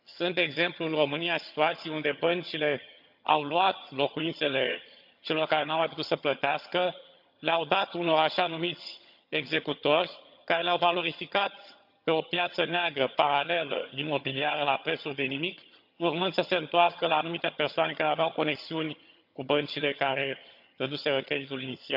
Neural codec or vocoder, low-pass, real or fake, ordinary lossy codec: vocoder, 22.05 kHz, 80 mel bands, HiFi-GAN; 5.4 kHz; fake; none